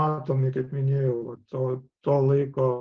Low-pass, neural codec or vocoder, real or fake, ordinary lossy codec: 7.2 kHz; none; real; Opus, 16 kbps